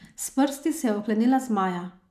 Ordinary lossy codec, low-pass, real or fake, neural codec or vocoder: none; 14.4 kHz; real; none